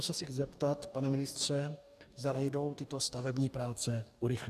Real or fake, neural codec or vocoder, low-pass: fake; codec, 44.1 kHz, 2.6 kbps, DAC; 14.4 kHz